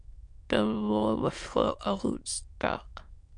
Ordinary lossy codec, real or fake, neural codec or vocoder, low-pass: MP3, 64 kbps; fake; autoencoder, 22.05 kHz, a latent of 192 numbers a frame, VITS, trained on many speakers; 9.9 kHz